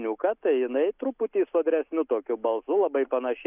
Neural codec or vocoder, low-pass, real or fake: none; 3.6 kHz; real